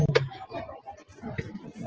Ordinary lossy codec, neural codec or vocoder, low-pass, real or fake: Opus, 16 kbps; none; 7.2 kHz; real